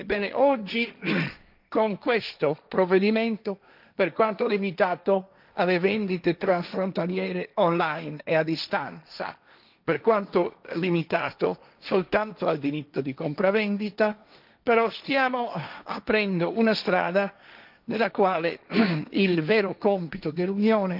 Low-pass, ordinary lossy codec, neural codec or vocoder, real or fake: 5.4 kHz; none; codec, 16 kHz, 1.1 kbps, Voila-Tokenizer; fake